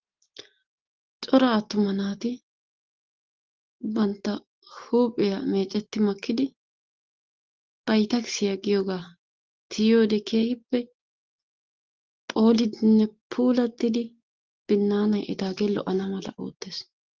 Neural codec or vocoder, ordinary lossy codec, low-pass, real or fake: none; Opus, 16 kbps; 7.2 kHz; real